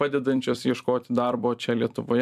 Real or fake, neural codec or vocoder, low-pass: fake; vocoder, 44.1 kHz, 128 mel bands every 256 samples, BigVGAN v2; 14.4 kHz